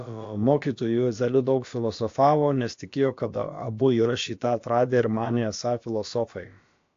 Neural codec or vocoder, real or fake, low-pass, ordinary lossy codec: codec, 16 kHz, about 1 kbps, DyCAST, with the encoder's durations; fake; 7.2 kHz; AAC, 48 kbps